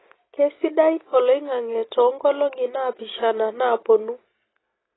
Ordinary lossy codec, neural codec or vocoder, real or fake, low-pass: AAC, 16 kbps; none; real; 7.2 kHz